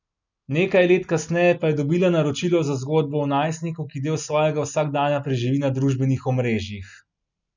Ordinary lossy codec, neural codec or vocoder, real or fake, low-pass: none; none; real; 7.2 kHz